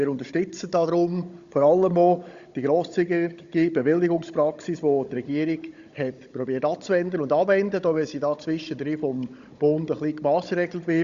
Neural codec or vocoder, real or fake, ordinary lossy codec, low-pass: codec, 16 kHz, 16 kbps, FunCodec, trained on Chinese and English, 50 frames a second; fake; Opus, 64 kbps; 7.2 kHz